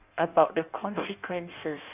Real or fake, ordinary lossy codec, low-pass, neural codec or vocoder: fake; none; 3.6 kHz; codec, 16 kHz in and 24 kHz out, 1.1 kbps, FireRedTTS-2 codec